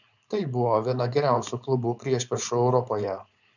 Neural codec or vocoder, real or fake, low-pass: codec, 16 kHz, 4.8 kbps, FACodec; fake; 7.2 kHz